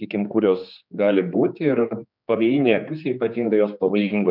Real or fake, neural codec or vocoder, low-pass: fake; codec, 16 kHz, 4 kbps, X-Codec, HuBERT features, trained on general audio; 5.4 kHz